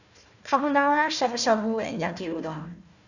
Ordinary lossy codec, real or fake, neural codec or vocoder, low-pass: none; fake; codec, 16 kHz, 1 kbps, FunCodec, trained on Chinese and English, 50 frames a second; 7.2 kHz